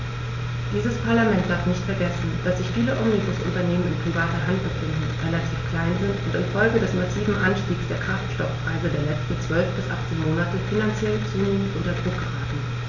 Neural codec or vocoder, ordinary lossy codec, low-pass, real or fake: none; none; 7.2 kHz; real